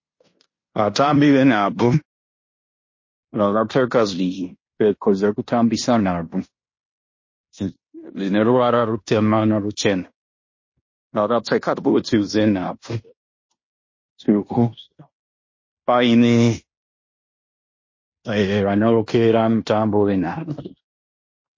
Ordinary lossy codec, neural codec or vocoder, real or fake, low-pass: MP3, 32 kbps; codec, 16 kHz in and 24 kHz out, 0.9 kbps, LongCat-Audio-Codec, fine tuned four codebook decoder; fake; 7.2 kHz